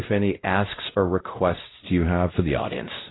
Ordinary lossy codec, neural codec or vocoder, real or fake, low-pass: AAC, 16 kbps; codec, 16 kHz, 0.5 kbps, X-Codec, WavLM features, trained on Multilingual LibriSpeech; fake; 7.2 kHz